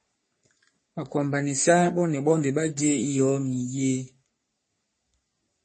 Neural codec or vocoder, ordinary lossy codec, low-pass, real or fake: codec, 44.1 kHz, 3.4 kbps, Pupu-Codec; MP3, 32 kbps; 9.9 kHz; fake